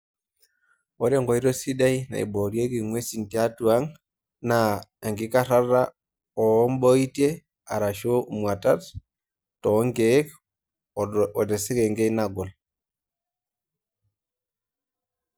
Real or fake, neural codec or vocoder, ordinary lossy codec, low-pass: real; none; none; none